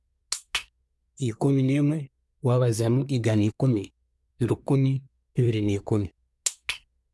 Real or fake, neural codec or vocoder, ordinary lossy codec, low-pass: fake; codec, 24 kHz, 1 kbps, SNAC; none; none